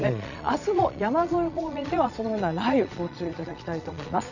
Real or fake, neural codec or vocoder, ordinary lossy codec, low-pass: fake; vocoder, 22.05 kHz, 80 mel bands, Vocos; none; 7.2 kHz